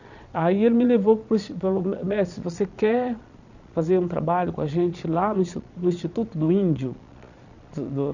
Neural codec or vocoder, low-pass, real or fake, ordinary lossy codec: vocoder, 22.05 kHz, 80 mel bands, Vocos; 7.2 kHz; fake; none